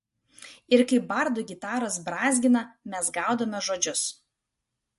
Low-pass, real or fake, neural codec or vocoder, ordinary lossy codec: 14.4 kHz; real; none; MP3, 48 kbps